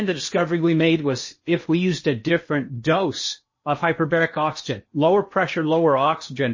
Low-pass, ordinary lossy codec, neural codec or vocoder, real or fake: 7.2 kHz; MP3, 32 kbps; codec, 16 kHz in and 24 kHz out, 0.8 kbps, FocalCodec, streaming, 65536 codes; fake